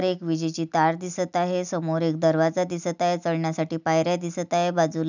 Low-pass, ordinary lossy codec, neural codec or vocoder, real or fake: 7.2 kHz; none; none; real